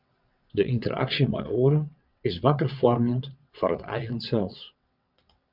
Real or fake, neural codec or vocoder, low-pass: fake; vocoder, 22.05 kHz, 80 mel bands, WaveNeXt; 5.4 kHz